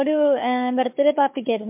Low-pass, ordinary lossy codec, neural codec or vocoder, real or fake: 3.6 kHz; MP3, 32 kbps; codec, 16 kHz, 4 kbps, FunCodec, trained on Chinese and English, 50 frames a second; fake